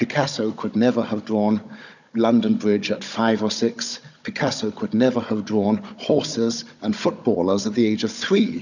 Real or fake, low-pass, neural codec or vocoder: fake; 7.2 kHz; codec, 16 kHz, 16 kbps, FunCodec, trained on Chinese and English, 50 frames a second